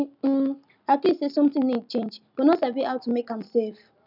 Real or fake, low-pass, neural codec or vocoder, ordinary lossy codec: real; 5.4 kHz; none; none